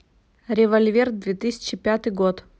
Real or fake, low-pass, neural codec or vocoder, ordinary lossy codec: real; none; none; none